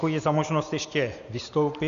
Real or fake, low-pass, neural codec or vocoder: real; 7.2 kHz; none